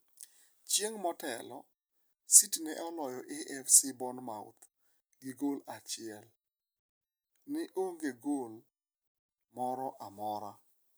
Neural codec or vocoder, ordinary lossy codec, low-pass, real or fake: none; none; none; real